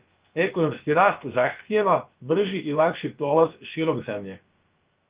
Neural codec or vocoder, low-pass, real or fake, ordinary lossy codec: codec, 16 kHz, about 1 kbps, DyCAST, with the encoder's durations; 3.6 kHz; fake; Opus, 32 kbps